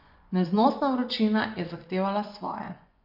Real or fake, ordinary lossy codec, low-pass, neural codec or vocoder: fake; none; 5.4 kHz; vocoder, 24 kHz, 100 mel bands, Vocos